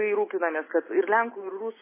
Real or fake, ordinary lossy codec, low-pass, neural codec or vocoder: real; MP3, 16 kbps; 3.6 kHz; none